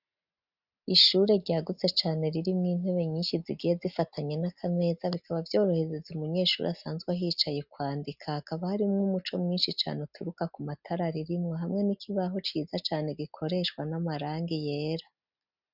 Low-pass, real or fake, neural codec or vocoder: 5.4 kHz; real; none